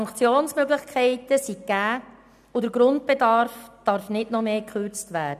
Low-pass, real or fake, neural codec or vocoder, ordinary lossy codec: 14.4 kHz; real; none; none